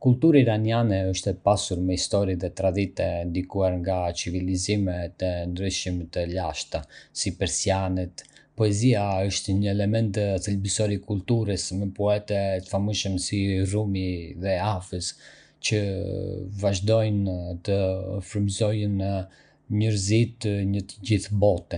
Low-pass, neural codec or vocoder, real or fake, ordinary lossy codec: 9.9 kHz; none; real; none